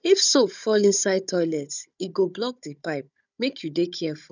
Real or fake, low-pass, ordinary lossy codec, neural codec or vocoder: fake; 7.2 kHz; none; codec, 16 kHz, 16 kbps, FunCodec, trained on Chinese and English, 50 frames a second